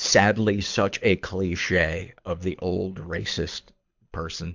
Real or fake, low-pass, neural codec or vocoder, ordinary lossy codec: fake; 7.2 kHz; codec, 24 kHz, 6 kbps, HILCodec; MP3, 64 kbps